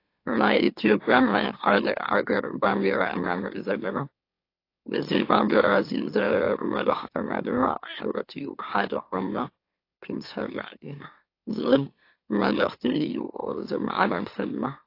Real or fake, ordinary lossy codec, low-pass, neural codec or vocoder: fake; AAC, 32 kbps; 5.4 kHz; autoencoder, 44.1 kHz, a latent of 192 numbers a frame, MeloTTS